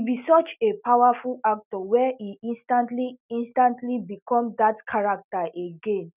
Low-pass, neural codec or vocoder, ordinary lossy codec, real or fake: 3.6 kHz; none; none; real